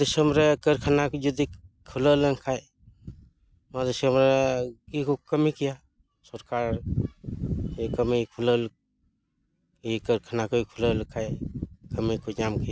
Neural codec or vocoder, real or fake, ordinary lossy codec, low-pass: none; real; none; none